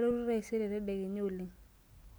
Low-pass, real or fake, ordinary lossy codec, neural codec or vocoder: none; real; none; none